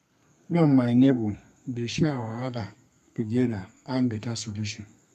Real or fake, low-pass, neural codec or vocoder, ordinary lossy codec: fake; 14.4 kHz; codec, 32 kHz, 1.9 kbps, SNAC; none